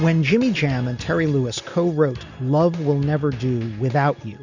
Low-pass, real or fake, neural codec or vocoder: 7.2 kHz; real; none